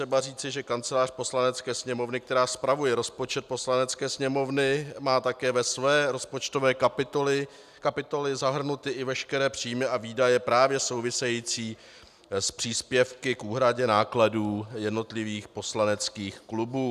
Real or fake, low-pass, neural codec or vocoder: real; 14.4 kHz; none